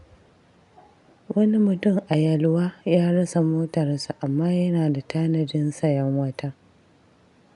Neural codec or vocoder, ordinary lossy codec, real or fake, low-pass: none; none; real; 10.8 kHz